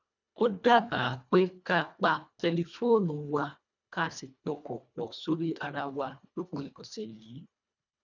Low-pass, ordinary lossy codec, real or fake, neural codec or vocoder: 7.2 kHz; none; fake; codec, 24 kHz, 1.5 kbps, HILCodec